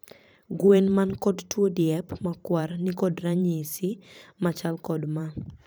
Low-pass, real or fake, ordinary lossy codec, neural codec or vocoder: none; fake; none; vocoder, 44.1 kHz, 128 mel bands every 512 samples, BigVGAN v2